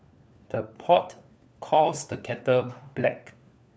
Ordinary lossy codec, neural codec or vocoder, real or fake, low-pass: none; codec, 16 kHz, 4 kbps, FunCodec, trained on LibriTTS, 50 frames a second; fake; none